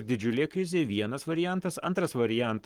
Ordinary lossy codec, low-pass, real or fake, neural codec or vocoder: Opus, 24 kbps; 19.8 kHz; fake; codec, 44.1 kHz, 7.8 kbps, Pupu-Codec